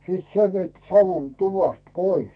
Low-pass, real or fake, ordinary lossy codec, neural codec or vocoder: 9.9 kHz; fake; none; codec, 44.1 kHz, 2.6 kbps, SNAC